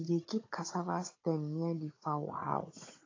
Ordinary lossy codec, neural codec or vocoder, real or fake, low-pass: AAC, 32 kbps; codec, 16 kHz, 16 kbps, FunCodec, trained on Chinese and English, 50 frames a second; fake; 7.2 kHz